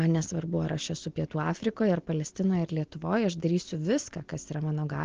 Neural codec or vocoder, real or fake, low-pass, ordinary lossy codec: none; real; 7.2 kHz; Opus, 16 kbps